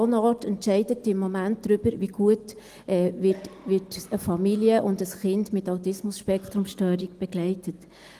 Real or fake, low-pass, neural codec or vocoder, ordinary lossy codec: real; 14.4 kHz; none; Opus, 24 kbps